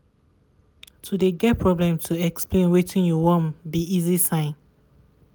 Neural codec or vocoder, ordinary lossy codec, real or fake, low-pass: none; none; real; none